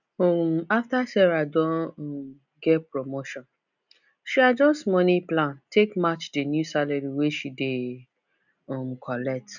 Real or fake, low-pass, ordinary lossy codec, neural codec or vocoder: real; 7.2 kHz; none; none